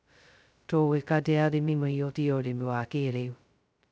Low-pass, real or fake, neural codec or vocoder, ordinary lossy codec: none; fake; codec, 16 kHz, 0.2 kbps, FocalCodec; none